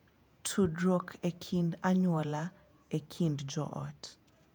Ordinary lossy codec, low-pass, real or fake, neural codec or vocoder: none; 19.8 kHz; fake; vocoder, 48 kHz, 128 mel bands, Vocos